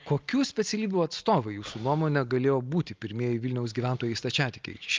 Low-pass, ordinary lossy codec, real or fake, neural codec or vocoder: 7.2 kHz; Opus, 32 kbps; real; none